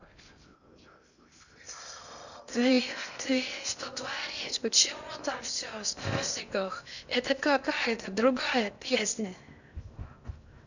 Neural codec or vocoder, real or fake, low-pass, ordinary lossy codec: codec, 16 kHz in and 24 kHz out, 0.6 kbps, FocalCodec, streaming, 4096 codes; fake; 7.2 kHz; none